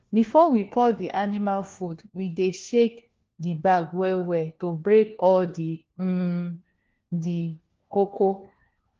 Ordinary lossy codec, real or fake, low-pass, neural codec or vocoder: Opus, 16 kbps; fake; 7.2 kHz; codec, 16 kHz, 1 kbps, FunCodec, trained on LibriTTS, 50 frames a second